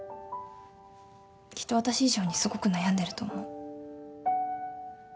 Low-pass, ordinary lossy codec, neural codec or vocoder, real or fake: none; none; none; real